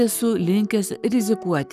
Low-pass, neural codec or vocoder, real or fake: 14.4 kHz; codec, 44.1 kHz, 7.8 kbps, Pupu-Codec; fake